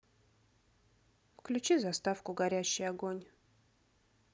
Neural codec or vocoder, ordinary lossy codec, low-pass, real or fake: none; none; none; real